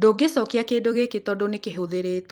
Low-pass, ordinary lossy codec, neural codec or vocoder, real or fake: 19.8 kHz; Opus, 32 kbps; vocoder, 44.1 kHz, 128 mel bands every 256 samples, BigVGAN v2; fake